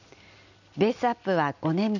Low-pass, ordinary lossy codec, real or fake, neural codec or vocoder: 7.2 kHz; none; real; none